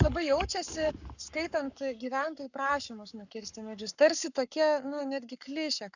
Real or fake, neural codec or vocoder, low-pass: fake; codec, 44.1 kHz, 7.8 kbps, Pupu-Codec; 7.2 kHz